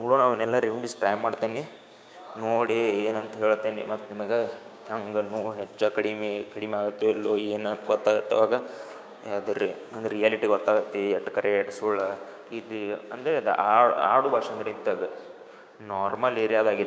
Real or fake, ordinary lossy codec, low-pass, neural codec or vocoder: fake; none; none; codec, 16 kHz, 6 kbps, DAC